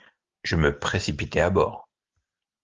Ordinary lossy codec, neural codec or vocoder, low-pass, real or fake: Opus, 32 kbps; none; 7.2 kHz; real